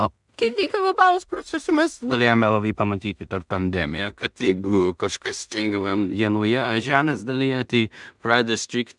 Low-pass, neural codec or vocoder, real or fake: 10.8 kHz; codec, 16 kHz in and 24 kHz out, 0.4 kbps, LongCat-Audio-Codec, two codebook decoder; fake